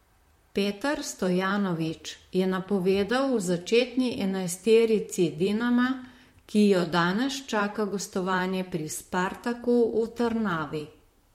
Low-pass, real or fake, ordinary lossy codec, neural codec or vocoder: 19.8 kHz; fake; MP3, 64 kbps; vocoder, 44.1 kHz, 128 mel bands, Pupu-Vocoder